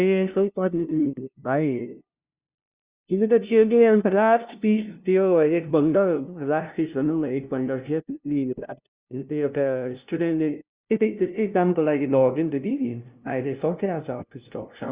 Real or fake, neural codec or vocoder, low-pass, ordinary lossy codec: fake; codec, 16 kHz, 0.5 kbps, FunCodec, trained on LibriTTS, 25 frames a second; 3.6 kHz; Opus, 64 kbps